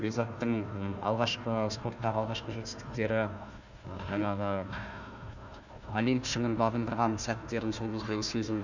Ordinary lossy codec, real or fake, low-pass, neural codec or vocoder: none; fake; 7.2 kHz; codec, 16 kHz, 1 kbps, FunCodec, trained on Chinese and English, 50 frames a second